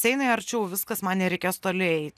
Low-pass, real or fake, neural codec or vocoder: 14.4 kHz; real; none